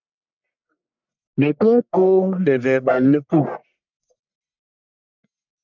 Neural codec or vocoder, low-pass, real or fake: codec, 44.1 kHz, 1.7 kbps, Pupu-Codec; 7.2 kHz; fake